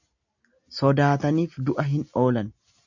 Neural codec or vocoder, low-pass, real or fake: none; 7.2 kHz; real